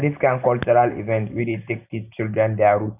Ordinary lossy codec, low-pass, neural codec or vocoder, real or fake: none; 3.6 kHz; none; real